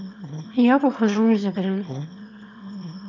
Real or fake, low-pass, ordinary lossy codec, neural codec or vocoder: fake; 7.2 kHz; none; autoencoder, 22.05 kHz, a latent of 192 numbers a frame, VITS, trained on one speaker